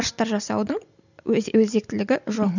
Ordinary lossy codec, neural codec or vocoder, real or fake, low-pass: none; none; real; 7.2 kHz